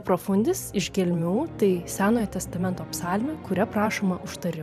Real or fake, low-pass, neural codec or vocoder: fake; 14.4 kHz; vocoder, 44.1 kHz, 128 mel bands every 512 samples, BigVGAN v2